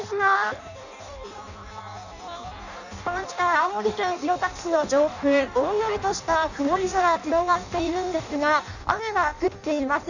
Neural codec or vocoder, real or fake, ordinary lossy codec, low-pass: codec, 16 kHz in and 24 kHz out, 0.6 kbps, FireRedTTS-2 codec; fake; none; 7.2 kHz